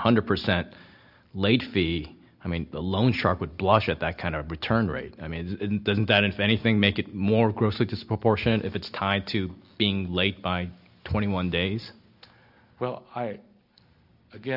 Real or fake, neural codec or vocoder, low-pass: real; none; 5.4 kHz